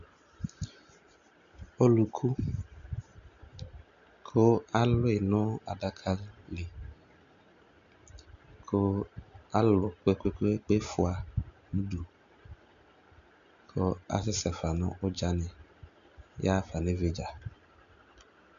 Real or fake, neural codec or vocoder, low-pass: real; none; 7.2 kHz